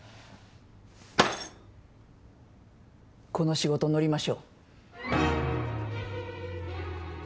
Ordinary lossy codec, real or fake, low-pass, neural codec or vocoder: none; real; none; none